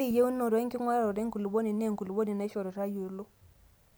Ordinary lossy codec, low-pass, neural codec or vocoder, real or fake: none; none; none; real